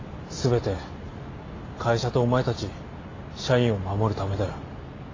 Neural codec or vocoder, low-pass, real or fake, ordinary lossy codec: none; 7.2 kHz; real; AAC, 32 kbps